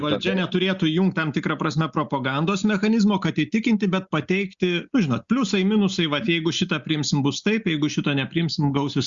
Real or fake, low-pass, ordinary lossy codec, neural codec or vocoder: real; 7.2 kHz; Opus, 64 kbps; none